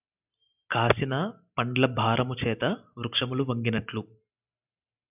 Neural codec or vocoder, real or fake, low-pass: none; real; 3.6 kHz